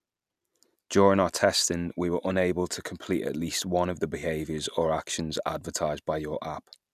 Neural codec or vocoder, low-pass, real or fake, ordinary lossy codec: none; 14.4 kHz; real; none